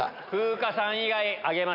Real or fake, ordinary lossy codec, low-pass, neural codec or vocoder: real; none; 5.4 kHz; none